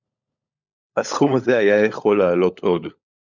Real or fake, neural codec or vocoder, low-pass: fake; codec, 16 kHz, 16 kbps, FunCodec, trained on LibriTTS, 50 frames a second; 7.2 kHz